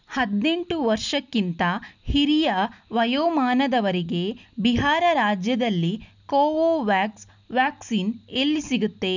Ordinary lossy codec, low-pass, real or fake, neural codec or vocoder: none; 7.2 kHz; real; none